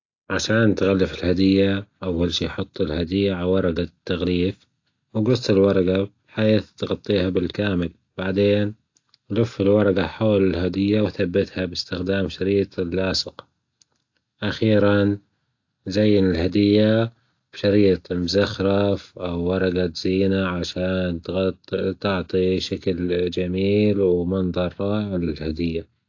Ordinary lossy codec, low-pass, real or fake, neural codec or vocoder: AAC, 48 kbps; 7.2 kHz; real; none